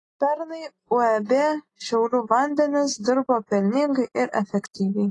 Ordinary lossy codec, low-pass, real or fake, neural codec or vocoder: AAC, 32 kbps; 10.8 kHz; real; none